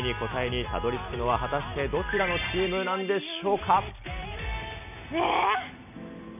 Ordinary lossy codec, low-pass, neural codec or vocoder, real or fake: none; 3.6 kHz; none; real